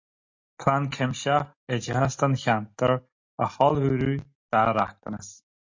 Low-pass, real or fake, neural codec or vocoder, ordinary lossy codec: 7.2 kHz; real; none; MP3, 48 kbps